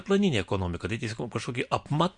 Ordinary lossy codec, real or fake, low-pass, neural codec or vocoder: MP3, 64 kbps; real; 9.9 kHz; none